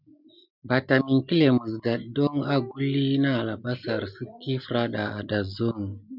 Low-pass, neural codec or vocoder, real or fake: 5.4 kHz; none; real